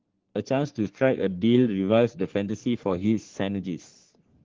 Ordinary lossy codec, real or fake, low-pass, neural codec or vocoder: Opus, 16 kbps; fake; 7.2 kHz; codec, 44.1 kHz, 3.4 kbps, Pupu-Codec